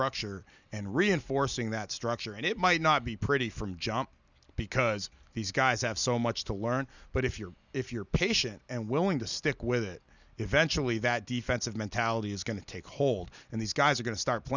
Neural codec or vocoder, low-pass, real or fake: none; 7.2 kHz; real